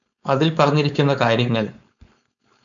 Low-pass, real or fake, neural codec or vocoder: 7.2 kHz; fake; codec, 16 kHz, 4.8 kbps, FACodec